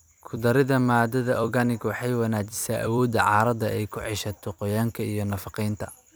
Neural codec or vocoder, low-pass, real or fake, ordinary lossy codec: vocoder, 44.1 kHz, 128 mel bands every 256 samples, BigVGAN v2; none; fake; none